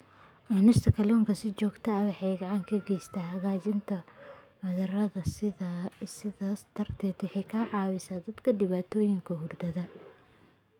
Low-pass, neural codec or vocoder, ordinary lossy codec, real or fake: 19.8 kHz; codec, 44.1 kHz, 7.8 kbps, DAC; none; fake